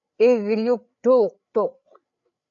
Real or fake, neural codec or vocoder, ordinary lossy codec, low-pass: fake; codec, 16 kHz, 8 kbps, FreqCodec, larger model; MP3, 64 kbps; 7.2 kHz